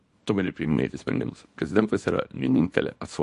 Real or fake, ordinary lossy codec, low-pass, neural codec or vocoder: fake; MP3, 48 kbps; 10.8 kHz; codec, 24 kHz, 0.9 kbps, WavTokenizer, small release